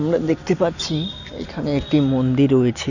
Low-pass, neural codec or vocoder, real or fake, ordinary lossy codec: 7.2 kHz; none; real; none